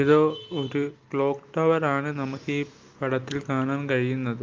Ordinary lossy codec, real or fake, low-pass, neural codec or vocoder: Opus, 24 kbps; real; 7.2 kHz; none